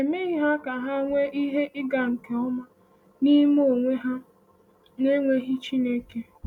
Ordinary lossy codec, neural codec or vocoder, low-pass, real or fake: none; none; 19.8 kHz; real